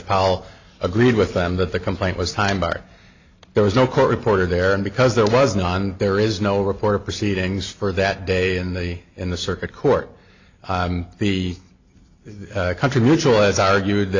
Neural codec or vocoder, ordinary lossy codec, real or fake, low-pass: none; AAC, 48 kbps; real; 7.2 kHz